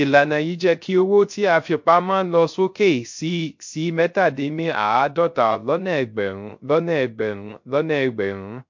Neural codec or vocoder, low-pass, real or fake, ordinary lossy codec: codec, 16 kHz, 0.3 kbps, FocalCodec; 7.2 kHz; fake; MP3, 48 kbps